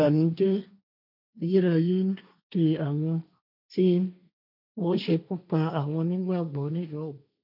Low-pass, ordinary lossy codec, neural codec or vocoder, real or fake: 5.4 kHz; none; codec, 16 kHz, 1.1 kbps, Voila-Tokenizer; fake